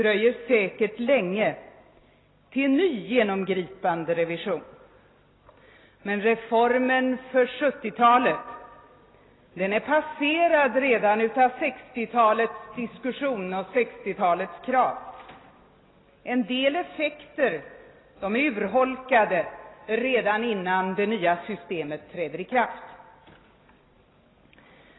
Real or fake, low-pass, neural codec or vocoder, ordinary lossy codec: real; 7.2 kHz; none; AAC, 16 kbps